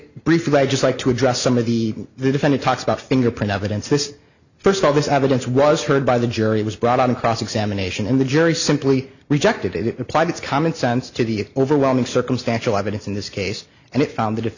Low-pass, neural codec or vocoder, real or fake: 7.2 kHz; none; real